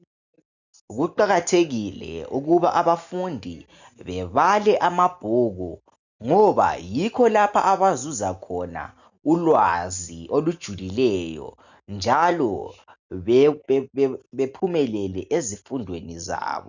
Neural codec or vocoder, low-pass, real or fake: none; 7.2 kHz; real